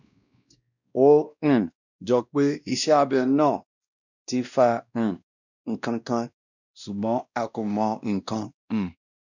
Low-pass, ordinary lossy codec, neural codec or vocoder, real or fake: 7.2 kHz; none; codec, 16 kHz, 1 kbps, X-Codec, WavLM features, trained on Multilingual LibriSpeech; fake